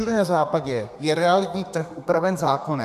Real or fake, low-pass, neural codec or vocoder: fake; 14.4 kHz; codec, 32 kHz, 1.9 kbps, SNAC